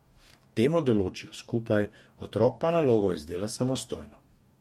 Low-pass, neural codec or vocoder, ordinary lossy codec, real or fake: 19.8 kHz; codec, 44.1 kHz, 2.6 kbps, DAC; MP3, 64 kbps; fake